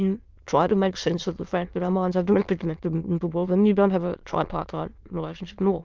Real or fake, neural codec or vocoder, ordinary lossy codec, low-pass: fake; autoencoder, 22.05 kHz, a latent of 192 numbers a frame, VITS, trained on many speakers; Opus, 32 kbps; 7.2 kHz